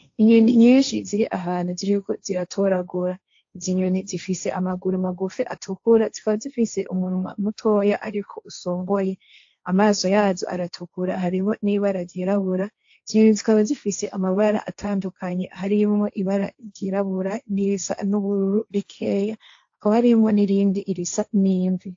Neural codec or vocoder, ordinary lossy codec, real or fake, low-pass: codec, 16 kHz, 1.1 kbps, Voila-Tokenizer; AAC, 48 kbps; fake; 7.2 kHz